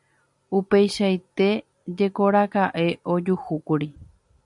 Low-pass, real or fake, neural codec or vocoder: 10.8 kHz; real; none